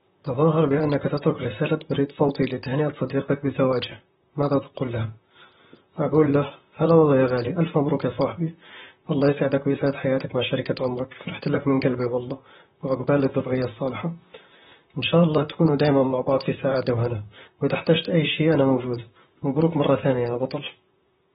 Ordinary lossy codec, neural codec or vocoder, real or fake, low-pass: AAC, 16 kbps; vocoder, 44.1 kHz, 128 mel bands, Pupu-Vocoder; fake; 19.8 kHz